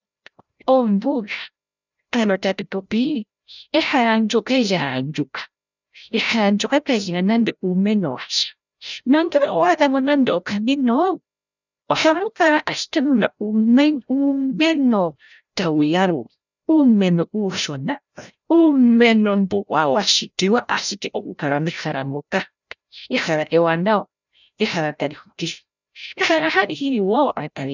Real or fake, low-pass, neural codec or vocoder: fake; 7.2 kHz; codec, 16 kHz, 0.5 kbps, FreqCodec, larger model